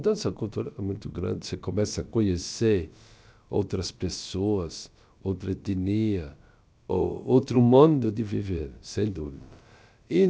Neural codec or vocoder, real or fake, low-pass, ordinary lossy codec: codec, 16 kHz, about 1 kbps, DyCAST, with the encoder's durations; fake; none; none